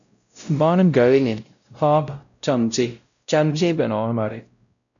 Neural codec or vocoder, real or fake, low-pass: codec, 16 kHz, 0.5 kbps, X-Codec, WavLM features, trained on Multilingual LibriSpeech; fake; 7.2 kHz